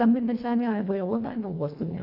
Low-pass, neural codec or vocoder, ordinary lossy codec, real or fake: 5.4 kHz; codec, 24 kHz, 1.5 kbps, HILCodec; AAC, 48 kbps; fake